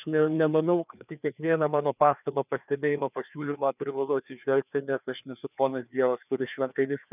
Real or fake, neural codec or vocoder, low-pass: fake; codec, 16 kHz, 2 kbps, FreqCodec, larger model; 3.6 kHz